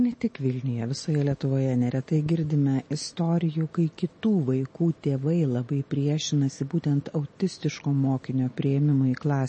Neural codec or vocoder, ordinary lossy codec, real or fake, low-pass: none; MP3, 32 kbps; real; 9.9 kHz